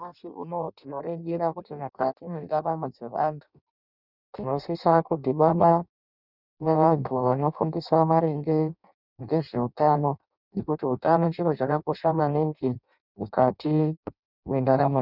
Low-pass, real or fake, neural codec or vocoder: 5.4 kHz; fake; codec, 16 kHz in and 24 kHz out, 0.6 kbps, FireRedTTS-2 codec